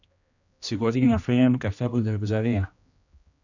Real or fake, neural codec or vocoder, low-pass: fake; codec, 16 kHz, 1 kbps, X-Codec, HuBERT features, trained on general audio; 7.2 kHz